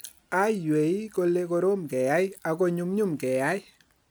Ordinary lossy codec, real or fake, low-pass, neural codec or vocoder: none; real; none; none